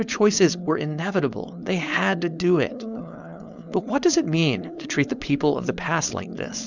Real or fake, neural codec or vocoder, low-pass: fake; codec, 16 kHz, 4.8 kbps, FACodec; 7.2 kHz